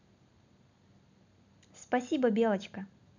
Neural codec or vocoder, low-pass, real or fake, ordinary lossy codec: none; 7.2 kHz; real; none